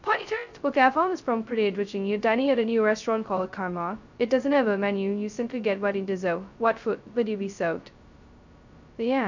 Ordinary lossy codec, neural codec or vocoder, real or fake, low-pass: none; codec, 16 kHz, 0.2 kbps, FocalCodec; fake; 7.2 kHz